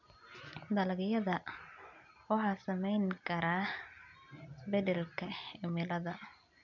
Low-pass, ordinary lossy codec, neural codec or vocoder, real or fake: 7.2 kHz; none; none; real